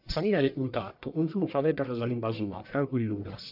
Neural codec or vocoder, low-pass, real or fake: codec, 44.1 kHz, 1.7 kbps, Pupu-Codec; 5.4 kHz; fake